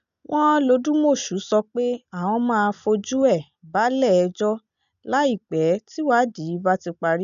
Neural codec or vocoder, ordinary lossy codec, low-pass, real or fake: none; none; 7.2 kHz; real